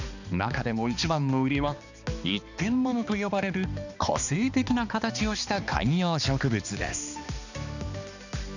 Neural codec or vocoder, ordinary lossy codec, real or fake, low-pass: codec, 16 kHz, 2 kbps, X-Codec, HuBERT features, trained on balanced general audio; none; fake; 7.2 kHz